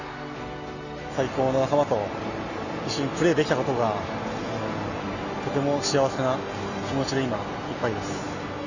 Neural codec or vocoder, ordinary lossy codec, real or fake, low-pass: none; none; real; 7.2 kHz